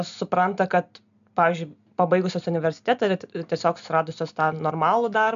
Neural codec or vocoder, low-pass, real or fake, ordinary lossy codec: none; 7.2 kHz; real; AAC, 96 kbps